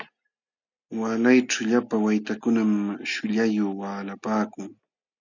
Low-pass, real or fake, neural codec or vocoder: 7.2 kHz; real; none